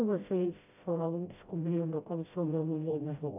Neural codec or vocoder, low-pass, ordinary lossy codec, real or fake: codec, 16 kHz, 0.5 kbps, FreqCodec, smaller model; 3.6 kHz; AAC, 24 kbps; fake